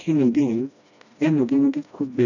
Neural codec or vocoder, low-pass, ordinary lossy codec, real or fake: codec, 16 kHz, 1 kbps, FreqCodec, smaller model; 7.2 kHz; none; fake